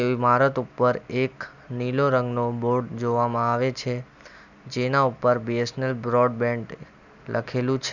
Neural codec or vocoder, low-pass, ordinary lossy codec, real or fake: none; 7.2 kHz; none; real